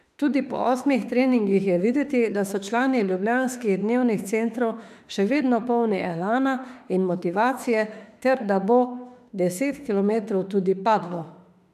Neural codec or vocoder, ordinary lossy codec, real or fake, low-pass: autoencoder, 48 kHz, 32 numbers a frame, DAC-VAE, trained on Japanese speech; none; fake; 14.4 kHz